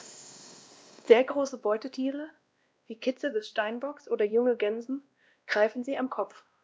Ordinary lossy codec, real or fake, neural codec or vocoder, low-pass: none; fake; codec, 16 kHz, 1 kbps, X-Codec, WavLM features, trained on Multilingual LibriSpeech; none